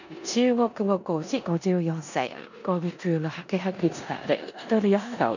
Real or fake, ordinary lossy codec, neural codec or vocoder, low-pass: fake; none; codec, 16 kHz in and 24 kHz out, 0.9 kbps, LongCat-Audio-Codec, four codebook decoder; 7.2 kHz